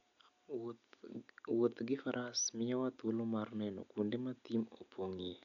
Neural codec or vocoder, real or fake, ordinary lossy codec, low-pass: none; real; none; 7.2 kHz